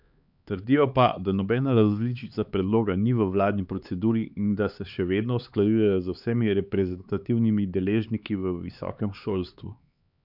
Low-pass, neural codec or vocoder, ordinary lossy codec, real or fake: 5.4 kHz; codec, 16 kHz, 4 kbps, X-Codec, HuBERT features, trained on LibriSpeech; none; fake